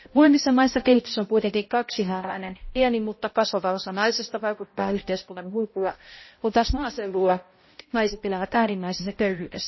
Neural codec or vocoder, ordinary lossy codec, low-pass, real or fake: codec, 16 kHz, 0.5 kbps, X-Codec, HuBERT features, trained on balanced general audio; MP3, 24 kbps; 7.2 kHz; fake